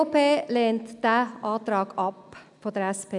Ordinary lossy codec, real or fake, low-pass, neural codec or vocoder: none; real; 10.8 kHz; none